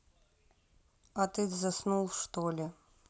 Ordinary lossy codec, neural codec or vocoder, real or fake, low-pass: none; none; real; none